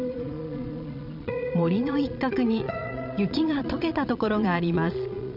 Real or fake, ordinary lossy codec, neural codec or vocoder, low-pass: fake; none; vocoder, 22.05 kHz, 80 mel bands, Vocos; 5.4 kHz